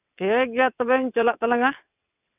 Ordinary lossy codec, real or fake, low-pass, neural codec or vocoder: none; fake; 3.6 kHz; vocoder, 22.05 kHz, 80 mel bands, WaveNeXt